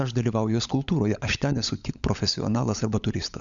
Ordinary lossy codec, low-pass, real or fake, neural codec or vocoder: Opus, 64 kbps; 7.2 kHz; fake; codec, 16 kHz, 16 kbps, FunCodec, trained on LibriTTS, 50 frames a second